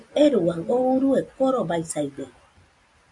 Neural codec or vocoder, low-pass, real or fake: none; 10.8 kHz; real